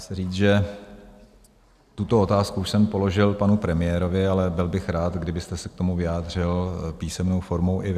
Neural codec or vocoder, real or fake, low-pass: none; real; 14.4 kHz